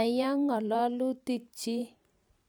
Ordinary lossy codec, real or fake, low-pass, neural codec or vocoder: none; fake; none; vocoder, 44.1 kHz, 128 mel bands every 512 samples, BigVGAN v2